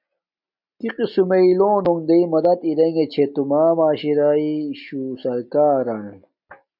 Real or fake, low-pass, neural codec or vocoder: real; 5.4 kHz; none